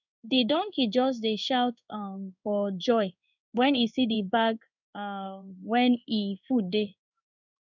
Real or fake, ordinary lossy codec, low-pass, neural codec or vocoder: fake; none; 7.2 kHz; codec, 16 kHz in and 24 kHz out, 1 kbps, XY-Tokenizer